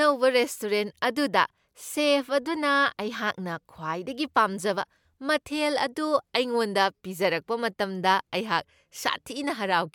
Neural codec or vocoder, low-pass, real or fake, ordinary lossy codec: none; 19.8 kHz; real; MP3, 96 kbps